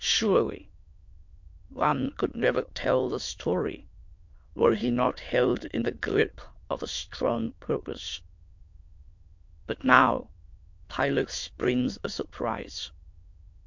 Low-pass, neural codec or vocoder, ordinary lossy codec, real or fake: 7.2 kHz; autoencoder, 22.05 kHz, a latent of 192 numbers a frame, VITS, trained on many speakers; MP3, 48 kbps; fake